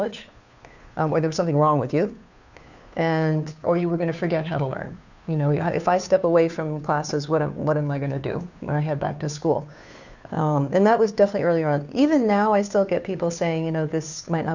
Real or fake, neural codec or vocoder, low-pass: fake; codec, 16 kHz, 2 kbps, FunCodec, trained on Chinese and English, 25 frames a second; 7.2 kHz